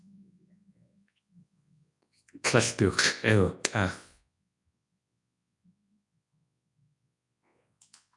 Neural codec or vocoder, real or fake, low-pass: codec, 24 kHz, 0.9 kbps, WavTokenizer, large speech release; fake; 10.8 kHz